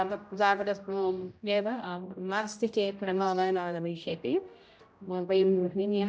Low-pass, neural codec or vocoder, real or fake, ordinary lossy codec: none; codec, 16 kHz, 0.5 kbps, X-Codec, HuBERT features, trained on general audio; fake; none